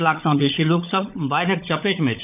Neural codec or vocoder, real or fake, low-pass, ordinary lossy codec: codec, 16 kHz, 16 kbps, FunCodec, trained on LibriTTS, 50 frames a second; fake; 3.6 kHz; none